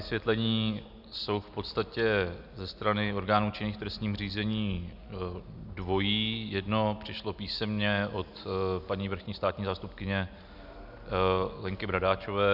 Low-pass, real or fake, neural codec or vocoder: 5.4 kHz; real; none